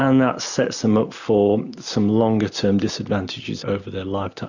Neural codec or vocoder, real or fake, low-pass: none; real; 7.2 kHz